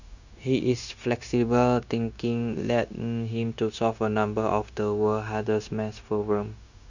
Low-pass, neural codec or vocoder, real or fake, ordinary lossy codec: 7.2 kHz; codec, 16 kHz, 0.9 kbps, LongCat-Audio-Codec; fake; none